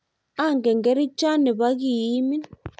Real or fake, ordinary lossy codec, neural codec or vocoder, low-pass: real; none; none; none